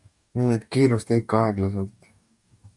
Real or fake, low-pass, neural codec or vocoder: fake; 10.8 kHz; codec, 44.1 kHz, 2.6 kbps, DAC